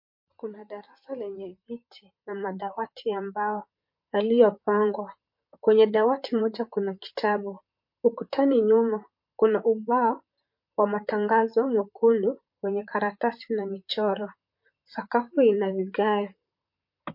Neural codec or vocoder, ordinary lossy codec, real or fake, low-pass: vocoder, 44.1 kHz, 128 mel bands, Pupu-Vocoder; MP3, 32 kbps; fake; 5.4 kHz